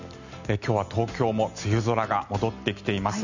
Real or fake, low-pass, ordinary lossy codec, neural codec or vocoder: real; 7.2 kHz; none; none